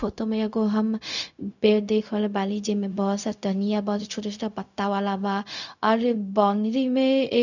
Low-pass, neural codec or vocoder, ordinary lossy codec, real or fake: 7.2 kHz; codec, 16 kHz, 0.4 kbps, LongCat-Audio-Codec; none; fake